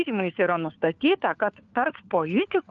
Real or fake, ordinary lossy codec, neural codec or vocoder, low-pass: fake; Opus, 32 kbps; codec, 16 kHz, 4 kbps, FunCodec, trained on LibriTTS, 50 frames a second; 7.2 kHz